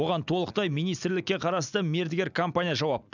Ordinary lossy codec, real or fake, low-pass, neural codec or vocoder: none; real; 7.2 kHz; none